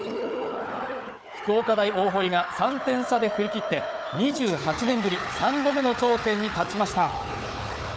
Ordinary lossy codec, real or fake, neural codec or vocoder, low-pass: none; fake; codec, 16 kHz, 4 kbps, FunCodec, trained on Chinese and English, 50 frames a second; none